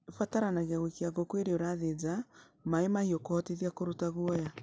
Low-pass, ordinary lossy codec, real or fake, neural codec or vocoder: none; none; real; none